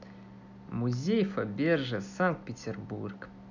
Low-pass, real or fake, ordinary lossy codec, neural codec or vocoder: 7.2 kHz; real; none; none